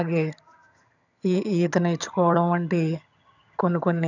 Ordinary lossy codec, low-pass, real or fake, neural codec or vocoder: none; 7.2 kHz; fake; vocoder, 22.05 kHz, 80 mel bands, HiFi-GAN